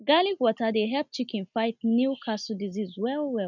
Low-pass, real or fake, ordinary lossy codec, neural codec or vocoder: 7.2 kHz; real; none; none